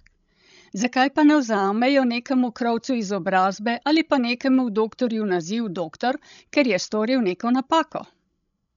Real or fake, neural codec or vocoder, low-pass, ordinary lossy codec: fake; codec, 16 kHz, 16 kbps, FreqCodec, larger model; 7.2 kHz; none